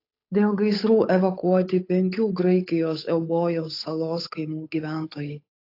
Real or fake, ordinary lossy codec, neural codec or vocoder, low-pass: fake; AAC, 32 kbps; codec, 16 kHz, 8 kbps, FunCodec, trained on Chinese and English, 25 frames a second; 5.4 kHz